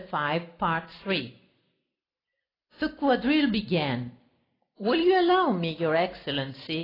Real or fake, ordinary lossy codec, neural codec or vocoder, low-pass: real; AAC, 24 kbps; none; 5.4 kHz